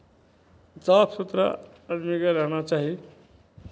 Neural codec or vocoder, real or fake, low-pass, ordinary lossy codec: none; real; none; none